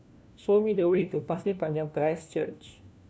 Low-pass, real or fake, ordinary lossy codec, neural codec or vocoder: none; fake; none; codec, 16 kHz, 1 kbps, FunCodec, trained on LibriTTS, 50 frames a second